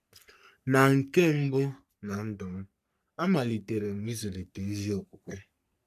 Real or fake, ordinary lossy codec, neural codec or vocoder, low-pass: fake; none; codec, 44.1 kHz, 3.4 kbps, Pupu-Codec; 14.4 kHz